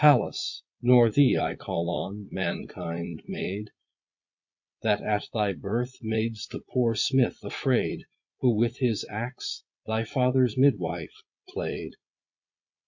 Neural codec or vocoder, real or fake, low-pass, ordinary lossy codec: vocoder, 22.05 kHz, 80 mel bands, Vocos; fake; 7.2 kHz; MP3, 48 kbps